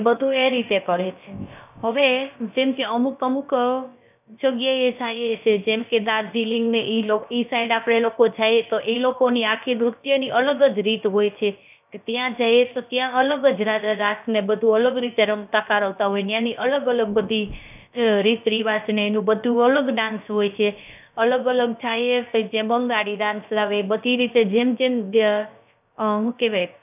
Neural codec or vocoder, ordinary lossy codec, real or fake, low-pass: codec, 16 kHz, about 1 kbps, DyCAST, with the encoder's durations; none; fake; 3.6 kHz